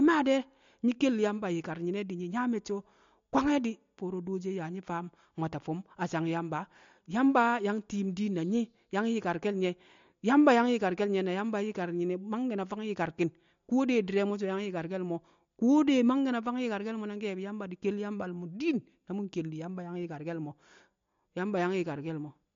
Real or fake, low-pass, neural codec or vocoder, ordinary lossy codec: real; 7.2 kHz; none; MP3, 48 kbps